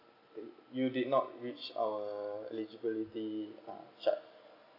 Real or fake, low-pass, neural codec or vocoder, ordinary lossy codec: real; 5.4 kHz; none; AAC, 48 kbps